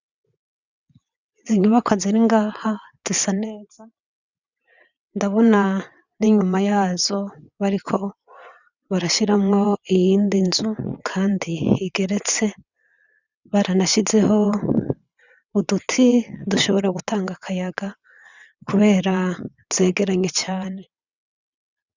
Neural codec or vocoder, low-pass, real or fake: vocoder, 22.05 kHz, 80 mel bands, WaveNeXt; 7.2 kHz; fake